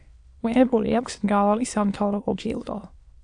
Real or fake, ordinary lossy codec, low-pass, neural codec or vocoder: fake; AAC, 64 kbps; 9.9 kHz; autoencoder, 22.05 kHz, a latent of 192 numbers a frame, VITS, trained on many speakers